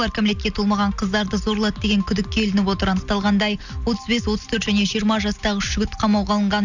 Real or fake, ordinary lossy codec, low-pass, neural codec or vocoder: real; none; 7.2 kHz; none